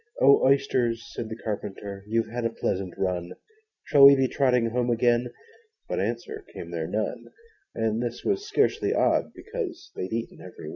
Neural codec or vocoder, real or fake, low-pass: none; real; 7.2 kHz